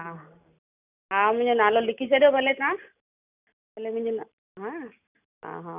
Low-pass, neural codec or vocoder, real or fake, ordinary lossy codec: 3.6 kHz; none; real; none